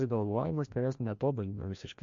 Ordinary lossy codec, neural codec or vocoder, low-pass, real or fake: MP3, 48 kbps; codec, 16 kHz, 1 kbps, FreqCodec, larger model; 7.2 kHz; fake